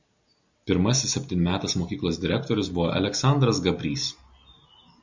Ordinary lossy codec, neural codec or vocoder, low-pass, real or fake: MP3, 64 kbps; none; 7.2 kHz; real